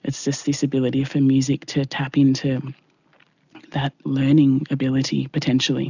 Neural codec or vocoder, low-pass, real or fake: none; 7.2 kHz; real